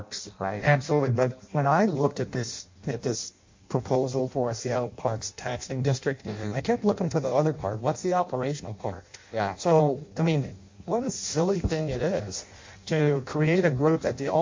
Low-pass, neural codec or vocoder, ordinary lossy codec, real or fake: 7.2 kHz; codec, 16 kHz in and 24 kHz out, 0.6 kbps, FireRedTTS-2 codec; MP3, 48 kbps; fake